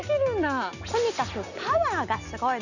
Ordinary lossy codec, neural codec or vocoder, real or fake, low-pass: none; none; real; 7.2 kHz